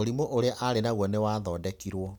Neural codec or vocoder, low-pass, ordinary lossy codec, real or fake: none; none; none; real